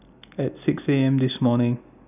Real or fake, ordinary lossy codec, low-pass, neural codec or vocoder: real; none; 3.6 kHz; none